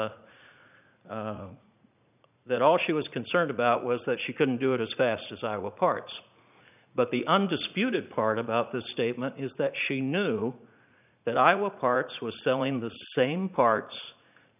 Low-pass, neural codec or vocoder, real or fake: 3.6 kHz; vocoder, 44.1 kHz, 80 mel bands, Vocos; fake